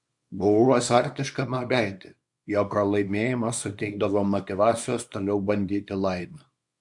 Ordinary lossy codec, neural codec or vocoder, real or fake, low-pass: MP3, 64 kbps; codec, 24 kHz, 0.9 kbps, WavTokenizer, small release; fake; 10.8 kHz